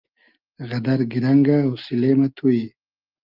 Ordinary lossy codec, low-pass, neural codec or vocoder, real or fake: Opus, 32 kbps; 5.4 kHz; none; real